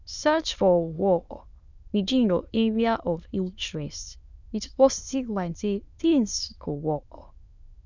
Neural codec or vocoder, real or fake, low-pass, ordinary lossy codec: autoencoder, 22.05 kHz, a latent of 192 numbers a frame, VITS, trained on many speakers; fake; 7.2 kHz; none